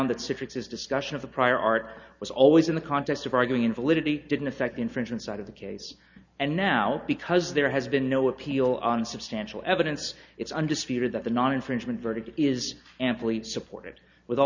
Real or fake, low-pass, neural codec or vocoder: real; 7.2 kHz; none